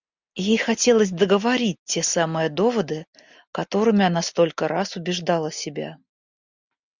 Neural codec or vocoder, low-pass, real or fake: none; 7.2 kHz; real